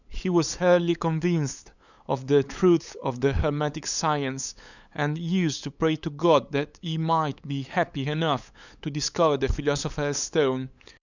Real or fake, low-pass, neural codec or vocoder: fake; 7.2 kHz; codec, 16 kHz, 8 kbps, FunCodec, trained on LibriTTS, 25 frames a second